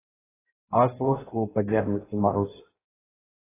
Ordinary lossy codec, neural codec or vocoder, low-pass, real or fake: AAC, 16 kbps; codec, 16 kHz in and 24 kHz out, 0.6 kbps, FireRedTTS-2 codec; 3.6 kHz; fake